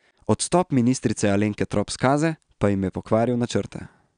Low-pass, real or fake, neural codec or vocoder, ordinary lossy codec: 9.9 kHz; real; none; none